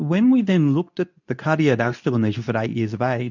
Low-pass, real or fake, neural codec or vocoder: 7.2 kHz; fake; codec, 24 kHz, 0.9 kbps, WavTokenizer, medium speech release version 2